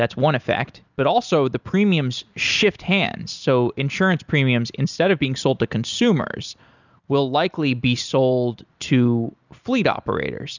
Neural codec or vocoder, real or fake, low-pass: none; real; 7.2 kHz